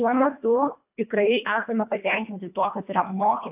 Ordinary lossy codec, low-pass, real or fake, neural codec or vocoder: AAC, 32 kbps; 3.6 kHz; fake; codec, 24 kHz, 1.5 kbps, HILCodec